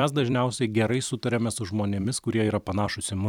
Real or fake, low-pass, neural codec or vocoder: fake; 19.8 kHz; vocoder, 44.1 kHz, 128 mel bands every 256 samples, BigVGAN v2